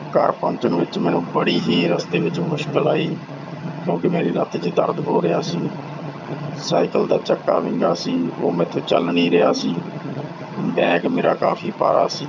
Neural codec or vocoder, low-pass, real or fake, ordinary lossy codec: vocoder, 22.05 kHz, 80 mel bands, HiFi-GAN; 7.2 kHz; fake; none